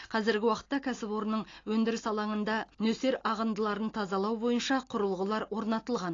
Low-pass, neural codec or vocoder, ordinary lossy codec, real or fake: 7.2 kHz; none; AAC, 32 kbps; real